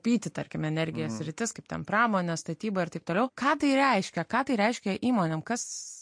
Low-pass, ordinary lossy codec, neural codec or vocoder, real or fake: 9.9 kHz; MP3, 48 kbps; none; real